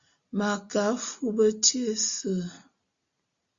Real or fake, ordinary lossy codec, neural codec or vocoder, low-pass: real; Opus, 64 kbps; none; 7.2 kHz